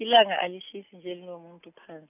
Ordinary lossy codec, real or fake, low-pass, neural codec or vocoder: none; real; 3.6 kHz; none